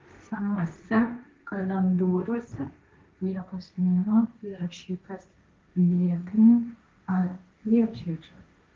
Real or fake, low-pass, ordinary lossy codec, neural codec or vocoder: fake; 7.2 kHz; Opus, 24 kbps; codec, 16 kHz, 1.1 kbps, Voila-Tokenizer